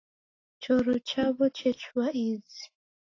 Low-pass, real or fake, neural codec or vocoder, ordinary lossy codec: 7.2 kHz; real; none; AAC, 32 kbps